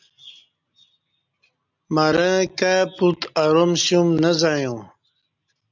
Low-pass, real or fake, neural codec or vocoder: 7.2 kHz; real; none